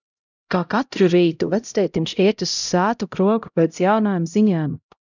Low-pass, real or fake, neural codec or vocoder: 7.2 kHz; fake; codec, 16 kHz, 0.5 kbps, X-Codec, HuBERT features, trained on LibriSpeech